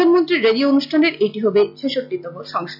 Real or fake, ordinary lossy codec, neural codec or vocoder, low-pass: real; none; none; 5.4 kHz